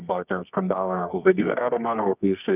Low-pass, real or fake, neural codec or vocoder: 3.6 kHz; fake; codec, 24 kHz, 0.9 kbps, WavTokenizer, medium music audio release